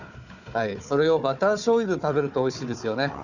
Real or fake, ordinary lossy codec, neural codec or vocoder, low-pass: fake; none; codec, 16 kHz, 4 kbps, FunCodec, trained on Chinese and English, 50 frames a second; 7.2 kHz